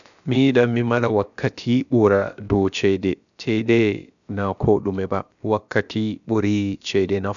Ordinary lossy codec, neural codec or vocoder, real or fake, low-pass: none; codec, 16 kHz, about 1 kbps, DyCAST, with the encoder's durations; fake; 7.2 kHz